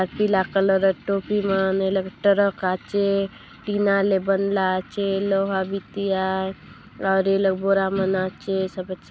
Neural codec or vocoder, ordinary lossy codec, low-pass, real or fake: none; none; none; real